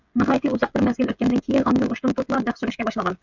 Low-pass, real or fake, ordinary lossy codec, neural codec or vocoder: 7.2 kHz; fake; MP3, 64 kbps; codec, 16 kHz, 16 kbps, FreqCodec, smaller model